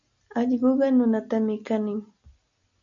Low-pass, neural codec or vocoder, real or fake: 7.2 kHz; none; real